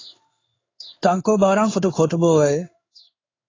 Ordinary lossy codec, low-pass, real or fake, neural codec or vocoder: AAC, 32 kbps; 7.2 kHz; fake; codec, 16 kHz in and 24 kHz out, 1 kbps, XY-Tokenizer